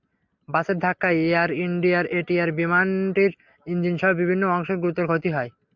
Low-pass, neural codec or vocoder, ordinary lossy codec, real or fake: 7.2 kHz; none; MP3, 64 kbps; real